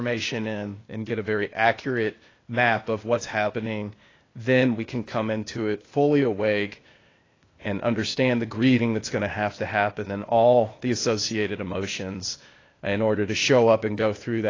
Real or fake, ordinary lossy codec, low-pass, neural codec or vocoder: fake; AAC, 32 kbps; 7.2 kHz; codec, 16 kHz, 0.8 kbps, ZipCodec